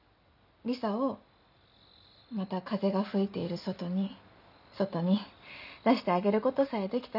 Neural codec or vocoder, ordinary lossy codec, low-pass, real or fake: none; none; 5.4 kHz; real